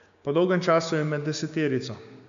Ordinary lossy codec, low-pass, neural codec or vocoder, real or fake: MP3, 64 kbps; 7.2 kHz; codec, 16 kHz, 6 kbps, DAC; fake